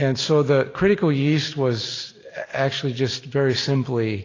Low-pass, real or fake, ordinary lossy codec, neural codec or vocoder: 7.2 kHz; real; AAC, 32 kbps; none